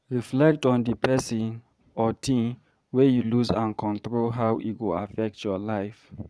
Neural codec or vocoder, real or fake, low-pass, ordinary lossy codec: vocoder, 22.05 kHz, 80 mel bands, Vocos; fake; none; none